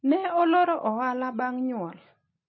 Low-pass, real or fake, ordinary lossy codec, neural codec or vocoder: 7.2 kHz; real; MP3, 24 kbps; none